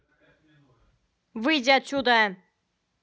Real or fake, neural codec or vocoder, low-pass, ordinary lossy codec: real; none; none; none